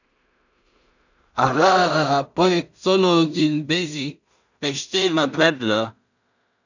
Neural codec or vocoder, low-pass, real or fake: codec, 16 kHz in and 24 kHz out, 0.4 kbps, LongCat-Audio-Codec, two codebook decoder; 7.2 kHz; fake